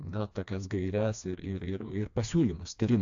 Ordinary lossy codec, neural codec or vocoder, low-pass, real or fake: AAC, 64 kbps; codec, 16 kHz, 2 kbps, FreqCodec, smaller model; 7.2 kHz; fake